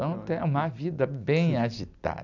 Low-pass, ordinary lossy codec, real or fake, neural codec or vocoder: 7.2 kHz; none; real; none